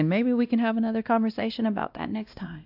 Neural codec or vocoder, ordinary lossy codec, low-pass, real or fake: codec, 16 kHz, 1 kbps, X-Codec, WavLM features, trained on Multilingual LibriSpeech; AAC, 48 kbps; 5.4 kHz; fake